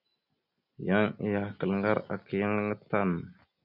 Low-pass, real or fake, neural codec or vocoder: 5.4 kHz; real; none